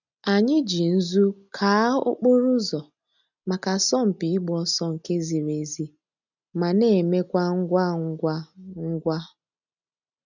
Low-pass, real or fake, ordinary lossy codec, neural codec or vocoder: 7.2 kHz; real; none; none